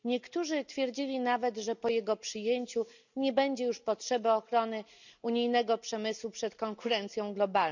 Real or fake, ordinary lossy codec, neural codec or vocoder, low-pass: real; none; none; 7.2 kHz